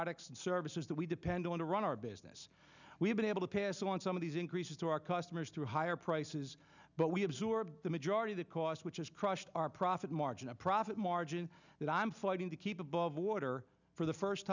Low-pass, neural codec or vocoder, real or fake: 7.2 kHz; none; real